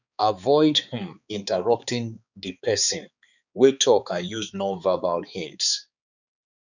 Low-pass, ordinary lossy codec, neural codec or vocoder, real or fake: 7.2 kHz; none; codec, 16 kHz, 4 kbps, X-Codec, HuBERT features, trained on balanced general audio; fake